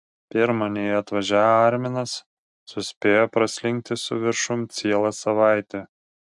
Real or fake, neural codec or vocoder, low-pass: real; none; 10.8 kHz